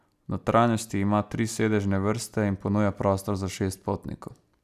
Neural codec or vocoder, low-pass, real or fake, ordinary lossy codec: none; 14.4 kHz; real; none